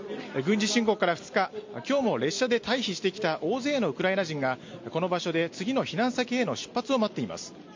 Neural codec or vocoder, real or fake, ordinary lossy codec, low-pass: none; real; MP3, 48 kbps; 7.2 kHz